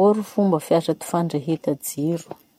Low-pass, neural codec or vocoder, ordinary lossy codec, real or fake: 19.8 kHz; none; MP3, 64 kbps; real